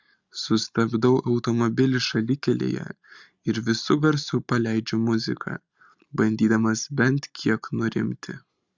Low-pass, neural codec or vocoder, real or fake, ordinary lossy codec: 7.2 kHz; none; real; Opus, 64 kbps